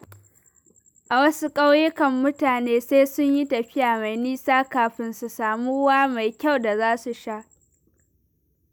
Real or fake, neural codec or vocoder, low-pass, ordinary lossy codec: real; none; none; none